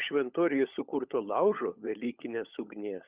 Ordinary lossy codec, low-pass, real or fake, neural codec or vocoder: Opus, 64 kbps; 3.6 kHz; fake; codec, 16 kHz, 16 kbps, FunCodec, trained on LibriTTS, 50 frames a second